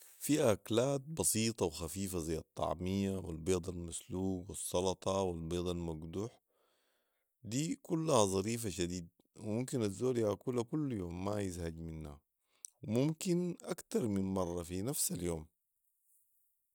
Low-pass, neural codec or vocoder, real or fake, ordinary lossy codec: none; none; real; none